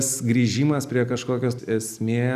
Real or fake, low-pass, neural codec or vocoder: real; 14.4 kHz; none